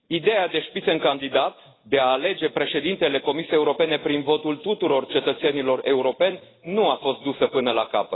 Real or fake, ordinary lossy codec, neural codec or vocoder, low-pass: real; AAC, 16 kbps; none; 7.2 kHz